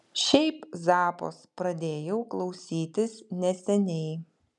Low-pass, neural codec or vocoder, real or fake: 10.8 kHz; none; real